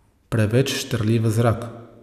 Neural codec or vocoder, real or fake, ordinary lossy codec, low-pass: none; real; none; 14.4 kHz